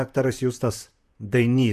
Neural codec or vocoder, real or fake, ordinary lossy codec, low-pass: vocoder, 44.1 kHz, 128 mel bands, Pupu-Vocoder; fake; MP3, 96 kbps; 14.4 kHz